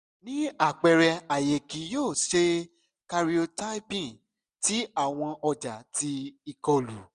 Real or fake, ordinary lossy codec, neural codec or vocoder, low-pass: real; none; none; 10.8 kHz